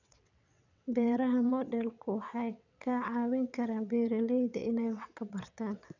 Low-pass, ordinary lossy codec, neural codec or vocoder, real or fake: 7.2 kHz; none; vocoder, 22.05 kHz, 80 mel bands, WaveNeXt; fake